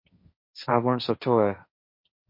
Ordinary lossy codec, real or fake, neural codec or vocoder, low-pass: MP3, 32 kbps; fake; codec, 16 kHz, 1.1 kbps, Voila-Tokenizer; 5.4 kHz